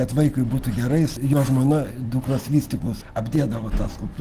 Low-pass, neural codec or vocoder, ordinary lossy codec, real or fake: 14.4 kHz; none; Opus, 16 kbps; real